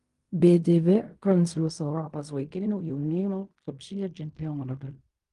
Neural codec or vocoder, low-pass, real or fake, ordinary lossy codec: codec, 16 kHz in and 24 kHz out, 0.4 kbps, LongCat-Audio-Codec, fine tuned four codebook decoder; 10.8 kHz; fake; Opus, 32 kbps